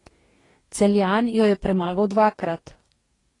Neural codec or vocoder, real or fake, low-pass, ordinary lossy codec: codec, 44.1 kHz, 2.6 kbps, DAC; fake; 10.8 kHz; AAC, 32 kbps